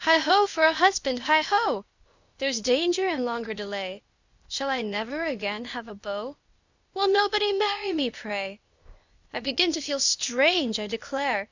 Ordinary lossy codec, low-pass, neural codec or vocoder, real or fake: Opus, 64 kbps; 7.2 kHz; codec, 16 kHz, 0.8 kbps, ZipCodec; fake